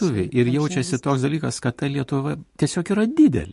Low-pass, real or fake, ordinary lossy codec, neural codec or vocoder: 14.4 kHz; real; MP3, 48 kbps; none